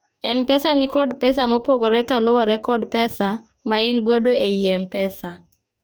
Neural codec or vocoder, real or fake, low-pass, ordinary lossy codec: codec, 44.1 kHz, 2.6 kbps, DAC; fake; none; none